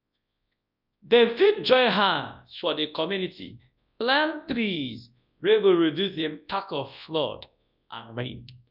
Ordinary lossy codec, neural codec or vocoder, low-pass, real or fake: none; codec, 24 kHz, 0.9 kbps, WavTokenizer, large speech release; 5.4 kHz; fake